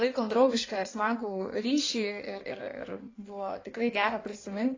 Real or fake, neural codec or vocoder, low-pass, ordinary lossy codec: fake; codec, 16 kHz in and 24 kHz out, 1.1 kbps, FireRedTTS-2 codec; 7.2 kHz; AAC, 32 kbps